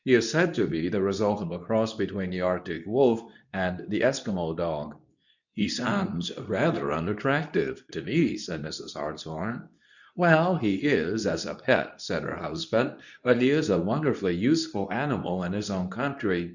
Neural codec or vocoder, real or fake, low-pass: codec, 24 kHz, 0.9 kbps, WavTokenizer, medium speech release version 2; fake; 7.2 kHz